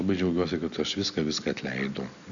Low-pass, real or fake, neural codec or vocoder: 7.2 kHz; real; none